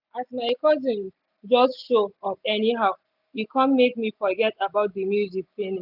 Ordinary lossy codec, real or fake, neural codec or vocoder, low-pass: none; real; none; 5.4 kHz